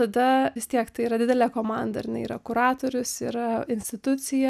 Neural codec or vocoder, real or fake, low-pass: none; real; 14.4 kHz